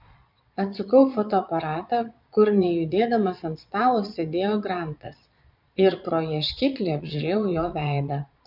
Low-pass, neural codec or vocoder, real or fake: 5.4 kHz; vocoder, 22.05 kHz, 80 mel bands, Vocos; fake